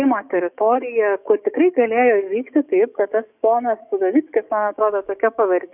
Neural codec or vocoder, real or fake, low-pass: codec, 44.1 kHz, 7.8 kbps, DAC; fake; 3.6 kHz